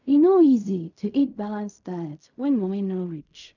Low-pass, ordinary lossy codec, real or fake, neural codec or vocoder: 7.2 kHz; none; fake; codec, 16 kHz in and 24 kHz out, 0.4 kbps, LongCat-Audio-Codec, fine tuned four codebook decoder